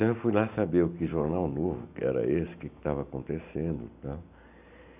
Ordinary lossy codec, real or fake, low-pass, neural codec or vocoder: none; real; 3.6 kHz; none